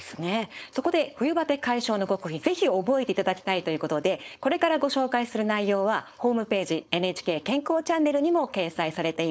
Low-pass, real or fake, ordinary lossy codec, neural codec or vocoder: none; fake; none; codec, 16 kHz, 4.8 kbps, FACodec